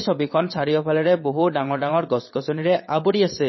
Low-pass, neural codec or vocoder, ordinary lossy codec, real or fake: 7.2 kHz; vocoder, 22.05 kHz, 80 mel bands, WaveNeXt; MP3, 24 kbps; fake